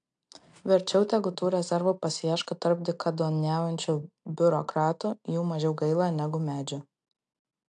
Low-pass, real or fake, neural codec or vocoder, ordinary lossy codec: 9.9 kHz; real; none; AAC, 64 kbps